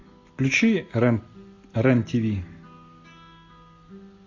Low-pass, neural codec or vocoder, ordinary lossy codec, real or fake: 7.2 kHz; none; AAC, 48 kbps; real